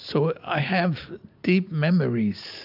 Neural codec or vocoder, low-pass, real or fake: none; 5.4 kHz; real